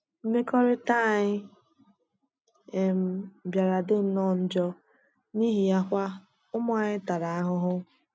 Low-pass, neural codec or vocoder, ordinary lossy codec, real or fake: none; none; none; real